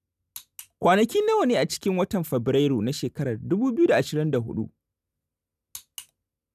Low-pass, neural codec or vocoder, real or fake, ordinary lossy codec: 14.4 kHz; none; real; none